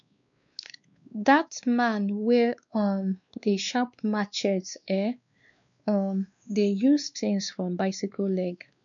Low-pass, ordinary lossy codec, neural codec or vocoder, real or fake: 7.2 kHz; none; codec, 16 kHz, 2 kbps, X-Codec, WavLM features, trained on Multilingual LibriSpeech; fake